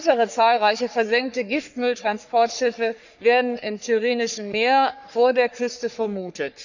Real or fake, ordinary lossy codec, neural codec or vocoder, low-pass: fake; none; codec, 44.1 kHz, 3.4 kbps, Pupu-Codec; 7.2 kHz